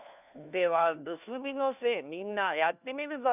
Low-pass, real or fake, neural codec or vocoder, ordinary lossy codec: 3.6 kHz; fake; codec, 16 kHz, 1 kbps, FunCodec, trained on LibriTTS, 50 frames a second; none